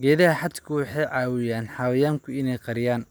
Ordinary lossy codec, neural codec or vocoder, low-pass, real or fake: none; none; none; real